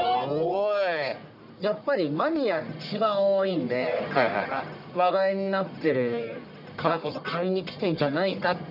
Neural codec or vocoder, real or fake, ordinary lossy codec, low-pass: codec, 44.1 kHz, 1.7 kbps, Pupu-Codec; fake; none; 5.4 kHz